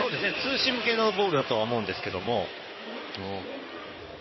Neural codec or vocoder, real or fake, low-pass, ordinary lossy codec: codec, 16 kHz in and 24 kHz out, 2.2 kbps, FireRedTTS-2 codec; fake; 7.2 kHz; MP3, 24 kbps